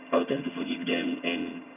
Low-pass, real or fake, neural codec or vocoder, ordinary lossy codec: 3.6 kHz; fake; vocoder, 22.05 kHz, 80 mel bands, HiFi-GAN; none